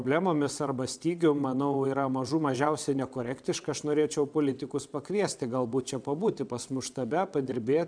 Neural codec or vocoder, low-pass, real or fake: vocoder, 22.05 kHz, 80 mel bands, WaveNeXt; 9.9 kHz; fake